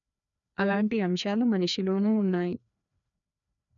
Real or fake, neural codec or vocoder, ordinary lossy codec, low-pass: fake; codec, 16 kHz, 2 kbps, FreqCodec, larger model; none; 7.2 kHz